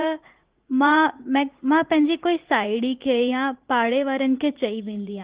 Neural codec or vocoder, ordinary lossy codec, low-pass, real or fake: vocoder, 44.1 kHz, 80 mel bands, Vocos; Opus, 16 kbps; 3.6 kHz; fake